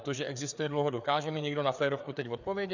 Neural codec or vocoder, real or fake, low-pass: codec, 16 kHz, 4 kbps, FreqCodec, larger model; fake; 7.2 kHz